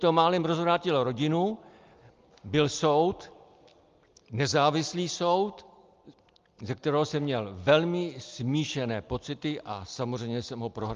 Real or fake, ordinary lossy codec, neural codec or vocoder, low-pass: real; Opus, 32 kbps; none; 7.2 kHz